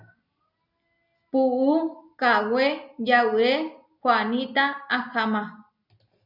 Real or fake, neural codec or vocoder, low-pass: real; none; 5.4 kHz